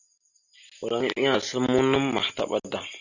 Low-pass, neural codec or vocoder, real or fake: 7.2 kHz; none; real